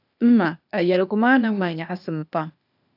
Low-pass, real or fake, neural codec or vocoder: 5.4 kHz; fake; codec, 16 kHz, 0.8 kbps, ZipCodec